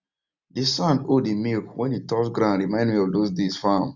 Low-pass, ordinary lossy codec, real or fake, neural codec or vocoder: 7.2 kHz; none; real; none